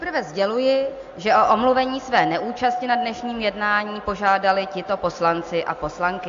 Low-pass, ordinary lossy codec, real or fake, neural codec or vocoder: 7.2 kHz; AAC, 48 kbps; real; none